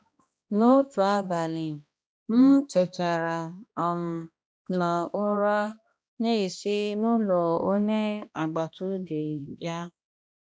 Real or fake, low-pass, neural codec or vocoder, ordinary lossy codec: fake; none; codec, 16 kHz, 1 kbps, X-Codec, HuBERT features, trained on balanced general audio; none